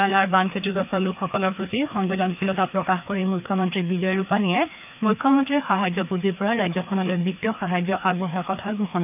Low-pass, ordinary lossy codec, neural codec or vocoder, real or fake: 3.6 kHz; none; codec, 16 kHz, 2 kbps, FreqCodec, larger model; fake